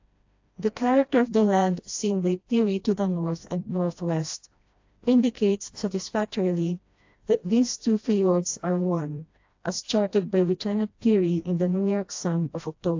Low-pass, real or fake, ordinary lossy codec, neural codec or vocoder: 7.2 kHz; fake; AAC, 48 kbps; codec, 16 kHz, 1 kbps, FreqCodec, smaller model